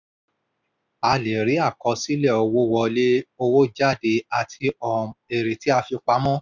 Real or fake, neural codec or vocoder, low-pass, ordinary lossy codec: real; none; 7.2 kHz; none